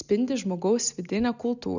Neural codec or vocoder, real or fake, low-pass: none; real; 7.2 kHz